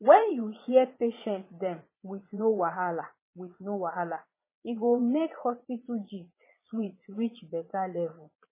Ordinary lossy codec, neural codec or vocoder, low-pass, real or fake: MP3, 16 kbps; vocoder, 22.05 kHz, 80 mel bands, Vocos; 3.6 kHz; fake